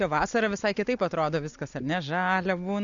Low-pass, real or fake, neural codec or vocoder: 7.2 kHz; real; none